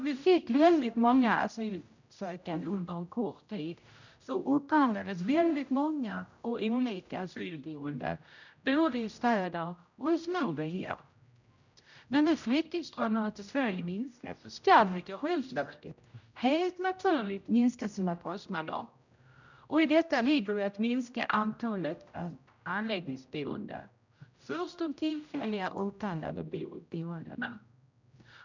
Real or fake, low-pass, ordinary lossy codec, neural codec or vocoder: fake; 7.2 kHz; none; codec, 16 kHz, 0.5 kbps, X-Codec, HuBERT features, trained on general audio